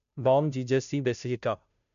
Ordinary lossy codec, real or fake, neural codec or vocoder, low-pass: none; fake; codec, 16 kHz, 0.5 kbps, FunCodec, trained on Chinese and English, 25 frames a second; 7.2 kHz